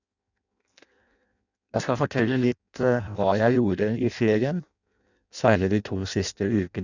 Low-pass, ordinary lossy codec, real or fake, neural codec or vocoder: 7.2 kHz; none; fake; codec, 16 kHz in and 24 kHz out, 0.6 kbps, FireRedTTS-2 codec